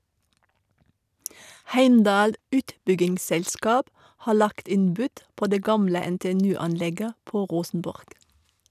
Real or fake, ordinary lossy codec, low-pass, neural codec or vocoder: real; none; 14.4 kHz; none